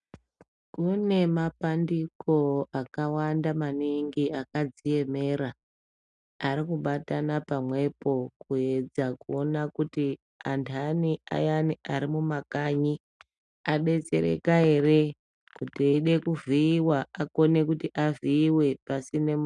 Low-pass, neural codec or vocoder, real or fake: 10.8 kHz; none; real